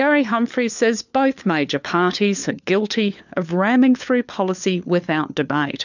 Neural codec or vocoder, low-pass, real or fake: codec, 16 kHz, 4 kbps, FunCodec, trained on LibriTTS, 50 frames a second; 7.2 kHz; fake